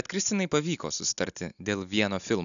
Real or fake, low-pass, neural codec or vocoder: real; 7.2 kHz; none